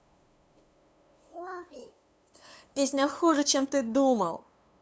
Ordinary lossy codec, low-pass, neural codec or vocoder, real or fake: none; none; codec, 16 kHz, 2 kbps, FunCodec, trained on LibriTTS, 25 frames a second; fake